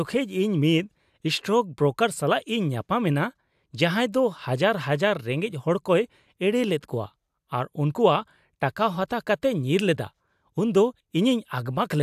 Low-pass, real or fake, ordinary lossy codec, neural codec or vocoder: 14.4 kHz; real; AAC, 96 kbps; none